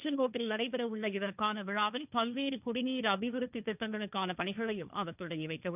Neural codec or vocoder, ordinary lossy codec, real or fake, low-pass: codec, 16 kHz, 1.1 kbps, Voila-Tokenizer; none; fake; 3.6 kHz